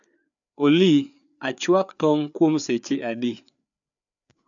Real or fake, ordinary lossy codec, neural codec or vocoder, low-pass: fake; none; codec, 16 kHz, 4 kbps, FreqCodec, larger model; 7.2 kHz